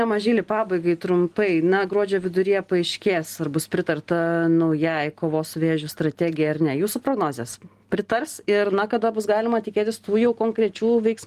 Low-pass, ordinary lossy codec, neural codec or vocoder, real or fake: 14.4 kHz; Opus, 24 kbps; none; real